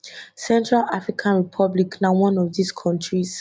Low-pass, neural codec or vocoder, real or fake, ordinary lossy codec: none; none; real; none